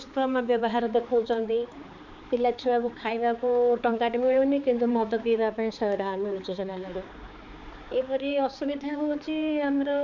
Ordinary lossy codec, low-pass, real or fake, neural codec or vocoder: none; 7.2 kHz; fake; codec, 16 kHz, 4 kbps, X-Codec, HuBERT features, trained on balanced general audio